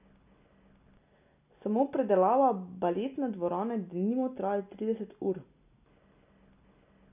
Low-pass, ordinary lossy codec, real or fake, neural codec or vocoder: 3.6 kHz; none; real; none